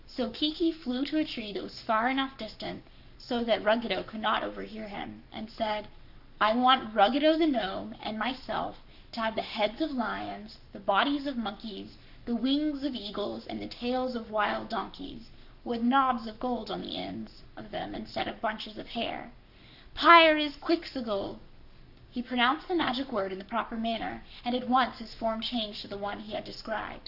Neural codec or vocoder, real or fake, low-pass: codec, 44.1 kHz, 7.8 kbps, Pupu-Codec; fake; 5.4 kHz